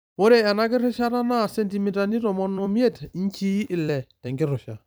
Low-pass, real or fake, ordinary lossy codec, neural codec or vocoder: none; fake; none; vocoder, 44.1 kHz, 128 mel bands every 256 samples, BigVGAN v2